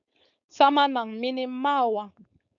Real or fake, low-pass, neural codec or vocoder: fake; 7.2 kHz; codec, 16 kHz, 4.8 kbps, FACodec